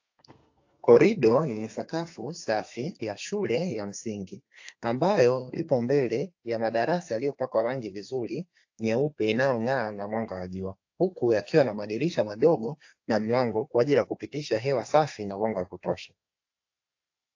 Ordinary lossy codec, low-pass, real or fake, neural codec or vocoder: AAC, 48 kbps; 7.2 kHz; fake; codec, 32 kHz, 1.9 kbps, SNAC